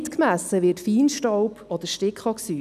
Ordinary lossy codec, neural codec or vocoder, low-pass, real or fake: none; none; 14.4 kHz; real